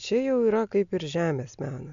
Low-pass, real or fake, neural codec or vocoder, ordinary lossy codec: 7.2 kHz; real; none; AAC, 64 kbps